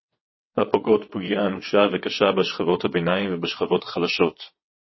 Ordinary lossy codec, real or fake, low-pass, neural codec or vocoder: MP3, 24 kbps; fake; 7.2 kHz; vocoder, 22.05 kHz, 80 mel bands, WaveNeXt